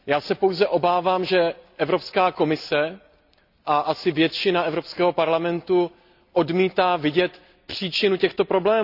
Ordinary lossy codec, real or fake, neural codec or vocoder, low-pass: none; real; none; 5.4 kHz